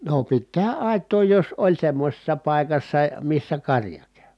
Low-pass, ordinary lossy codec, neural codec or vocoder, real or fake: none; none; none; real